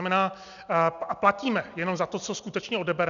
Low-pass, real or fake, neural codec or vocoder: 7.2 kHz; real; none